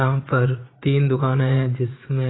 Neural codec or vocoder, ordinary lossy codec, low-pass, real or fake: vocoder, 44.1 kHz, 80 mel bands, Vocos; AAC, 16 kbps; 7.2 kHz; fake